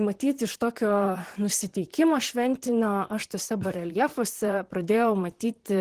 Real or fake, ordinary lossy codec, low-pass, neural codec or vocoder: fake; Opus, 16 kbps; 14.4 kHz; vocoder, 44.1 kHz, 128 mel bands, Pupu-Vocoder